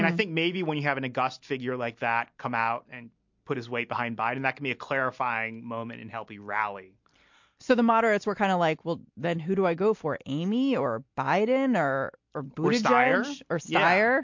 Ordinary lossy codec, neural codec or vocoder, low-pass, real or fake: MP3, 48 kbps; none; 7.2 kHz; real